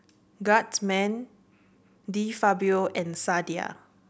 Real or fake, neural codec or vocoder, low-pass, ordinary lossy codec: real; none; none; none